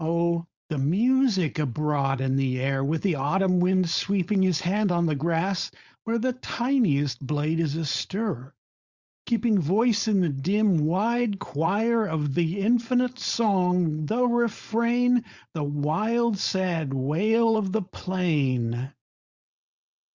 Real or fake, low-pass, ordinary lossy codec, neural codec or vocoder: fake; 7.2 kHz; Opus, 64 kbps; codec, 16 kHz, 4.8 kbps, FACodec